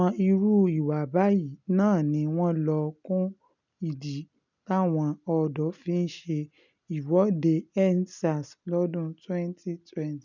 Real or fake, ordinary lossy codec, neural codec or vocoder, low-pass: real; none; none; 7.2 kHz